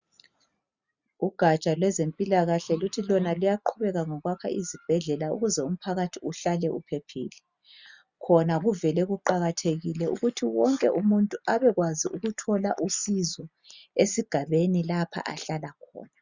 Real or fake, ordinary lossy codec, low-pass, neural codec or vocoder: real; Opus, 64 kbps; 7.2 kHz; none